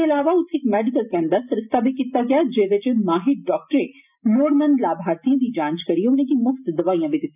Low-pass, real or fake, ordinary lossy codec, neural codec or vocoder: 3.6 kHz; real; none; none